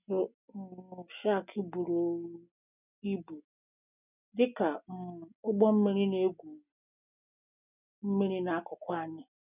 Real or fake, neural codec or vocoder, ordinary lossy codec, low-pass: real; none; none; 3.6 kHz